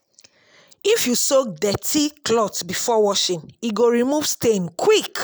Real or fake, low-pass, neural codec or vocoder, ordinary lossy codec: real; none; none; none